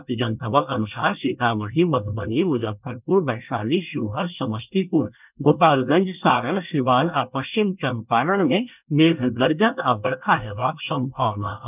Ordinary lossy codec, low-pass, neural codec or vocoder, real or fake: none; 3.6 kHz; codec, 24 kHz, 1 kbps, SNAC; fake